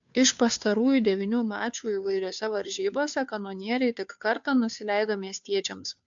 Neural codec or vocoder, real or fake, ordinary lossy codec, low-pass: codec, 16 kHz, 2 kbps, FunCodec, trained on Chinese and English, 25 frames a second; fake; AAC, 64 kbps; 7.2 kHz